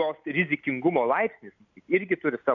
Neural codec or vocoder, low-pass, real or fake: none; 7.2 kHz; real